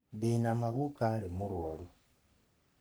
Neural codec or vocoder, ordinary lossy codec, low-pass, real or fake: codec, 44.1 kHz, 3.4 kbps, Pupu-Codec; none; none; fake